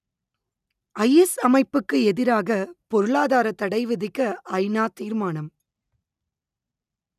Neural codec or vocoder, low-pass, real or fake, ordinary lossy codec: none; 14.4 kHz; real; none